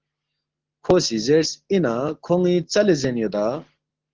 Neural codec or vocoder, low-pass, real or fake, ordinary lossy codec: none; 7.2 kHz; real; Opus, 16 kbps